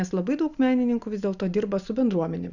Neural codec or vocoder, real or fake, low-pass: none; real; 7.2 kHz